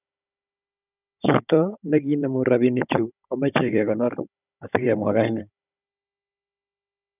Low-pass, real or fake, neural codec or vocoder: 3.6 kHz; fake; codec, 16 kHz, 16 kbps, FunCodec, trained on Chinese and English, 50 frames a second